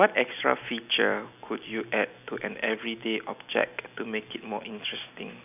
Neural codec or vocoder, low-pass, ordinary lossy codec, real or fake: none; 3.6 kHz; none; real